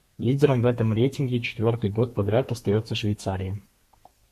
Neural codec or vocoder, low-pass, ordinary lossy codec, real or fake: codec, 32 kHz, 1.9 kbps, SNAC; 14.4 kHz; MP3, 64 kbps; fake